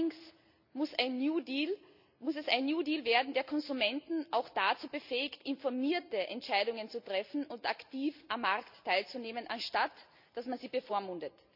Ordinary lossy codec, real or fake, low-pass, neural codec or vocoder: none; real; 5.4 kHz; none